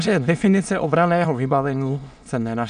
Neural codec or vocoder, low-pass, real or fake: autoencoder, 22.05 kHz, a latent of 192 numbers a frame, VITS, trained on many speakers; 9.9 kHz; fake